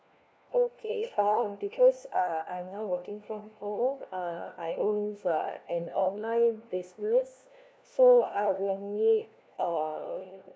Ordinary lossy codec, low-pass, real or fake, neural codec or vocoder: none; none; fake; codec, 16 kHz, 1 kbps, FunCodec, trained on LibriTTS, 50 frames a second